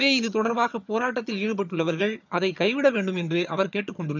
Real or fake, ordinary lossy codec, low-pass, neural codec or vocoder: fake; none; 7.2 kHz; vocoder, 22.05 kHz, 80 mel bands, HiFi-GAN